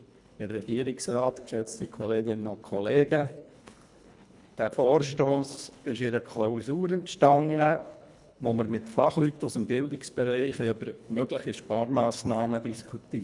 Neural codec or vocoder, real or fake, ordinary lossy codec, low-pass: codec, 24 kHz, 1.5 kbps, HILCodec; fake; none; 10.8 kHz